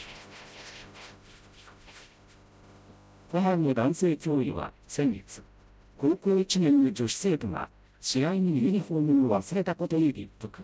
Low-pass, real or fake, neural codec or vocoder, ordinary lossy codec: none; fake; codec, 16 kHz, 0.5 kbps, FreqCodec, smaller model; none